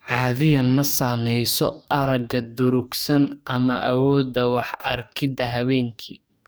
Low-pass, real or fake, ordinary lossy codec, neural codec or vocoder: none; fake; none; codec, 44.1 kHz, 2.6 kbps, DAC